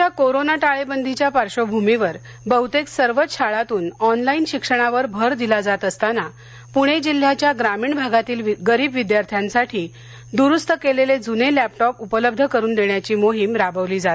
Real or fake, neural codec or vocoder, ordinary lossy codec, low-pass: real; none; none; none